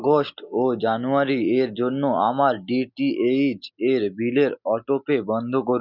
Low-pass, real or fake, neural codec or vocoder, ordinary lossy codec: 5.4 kHz; real; none; none